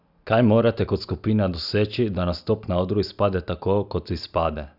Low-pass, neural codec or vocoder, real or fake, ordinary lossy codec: 5.4 kHz; none; real; none